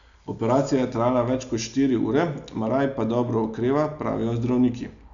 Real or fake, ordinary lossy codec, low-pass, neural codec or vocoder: real; none; 7.2 kHz; none